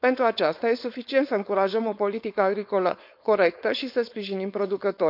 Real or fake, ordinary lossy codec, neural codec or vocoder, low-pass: fake; none; codec, 16 kHz, 4.8 kbps, FACodec; 5.4 kHz